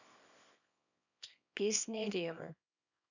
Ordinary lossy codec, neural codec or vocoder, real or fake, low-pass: none; codec, 24 kHz, 0.9 kbps, WavTokenizer, small release; fake; 7.2 kHz